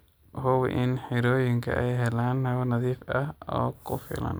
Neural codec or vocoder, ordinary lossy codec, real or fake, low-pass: none; none; real; none